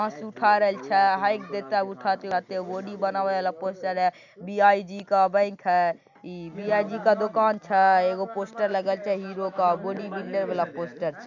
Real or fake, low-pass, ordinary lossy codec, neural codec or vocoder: real; 7.2 kHz; none; none